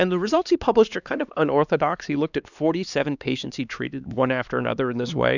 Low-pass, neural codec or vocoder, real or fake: 7.2 kHz; codec, 16 kHz, 2 kbps, X-Codec, HuBERT features, trained on LibriSpeech; fake